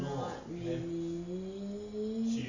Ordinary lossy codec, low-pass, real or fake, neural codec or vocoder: none; 7.2 kHz; real; none